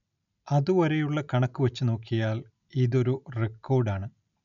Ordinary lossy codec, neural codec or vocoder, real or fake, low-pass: none; none; real; 7.2 kHz